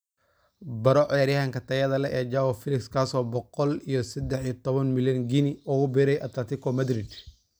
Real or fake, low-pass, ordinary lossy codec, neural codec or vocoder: real; none; none; none